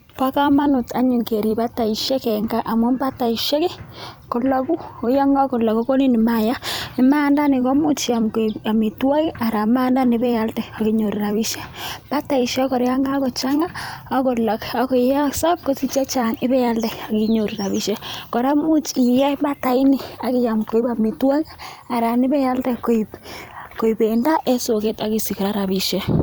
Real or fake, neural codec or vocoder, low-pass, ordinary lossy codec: fake; vocoder, 44.1 kHz, 128 mel bands every 256 samples, BigVGAN v2; none; none